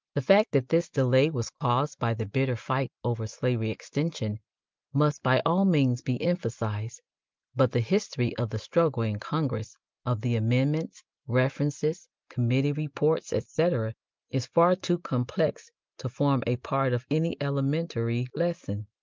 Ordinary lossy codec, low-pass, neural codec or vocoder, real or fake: Opus, 32 kbps; 7.2 kHz; none; real